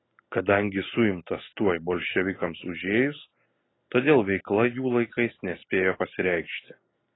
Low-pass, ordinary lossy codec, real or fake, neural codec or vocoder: 7.2 kHz; AAC, 16 kbps; real; none